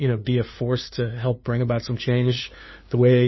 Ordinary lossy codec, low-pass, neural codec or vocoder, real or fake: MP3, 24 kbps; 7.2 kHz; codec, 16 kHz, 2 kbps, FunCodec, trained on LibriTTS, 25 frames a second; fake